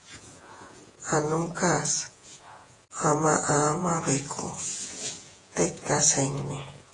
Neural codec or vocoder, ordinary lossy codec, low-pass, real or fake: vocoder, 48 kHz, 128 mel bands, Vocos; AAC, 32 kbps; 10.8 kHz; fake